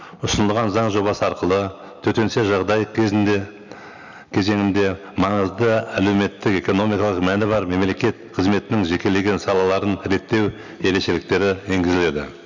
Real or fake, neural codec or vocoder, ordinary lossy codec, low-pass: real; none; none; 7.2 kHz